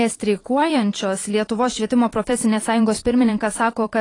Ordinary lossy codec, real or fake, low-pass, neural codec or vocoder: AAC, 32 kbps; fake; 10.8 kHz; vocoder, 44.1 kHz, 128 mel bands every 512 samples, BigVGAN v2